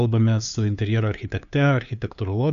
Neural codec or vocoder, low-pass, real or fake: codec, 16 kHz, 2 kbps, FunCodec, trained on LibriTTS, 25 frames a second; 7.2 kHz; fake